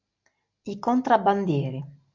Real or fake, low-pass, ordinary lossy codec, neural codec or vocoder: real; 7.2 kHz; MP3, 64 kbps; none